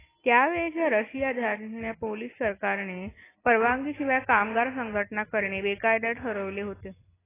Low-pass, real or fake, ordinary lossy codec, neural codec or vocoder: 3.6 kHz; real; AAC, 16 kbps; none